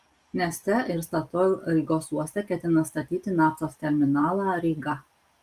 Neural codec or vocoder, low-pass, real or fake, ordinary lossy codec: none; 14.4 kHz; real; Opus, 32 kbps